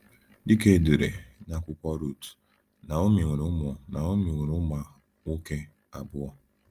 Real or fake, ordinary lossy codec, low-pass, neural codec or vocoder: real; Opus, 32 kbps; 14.4 kHz; none